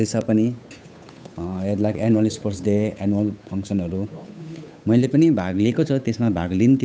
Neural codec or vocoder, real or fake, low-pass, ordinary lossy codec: codec, 16 kHz, 8 kbps, FunCodec, trained on Chinese and English, 25 frames a second; fake; none; none